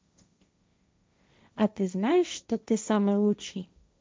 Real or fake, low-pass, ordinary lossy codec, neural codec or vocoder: fake; none; none; codec, 16 kHz, 1.1 kbps, Voila-Tokenizer